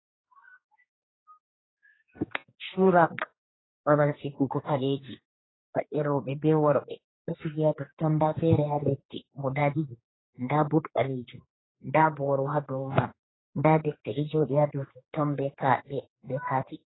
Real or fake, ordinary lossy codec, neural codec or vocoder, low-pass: fake; AAC, 16 kbps; codec, 16 kHz, 2 kbps, X-Codec, HuBERT features, trained on general audio; 7.2 kHz